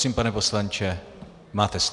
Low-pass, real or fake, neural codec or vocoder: 10.8 kHz; real; none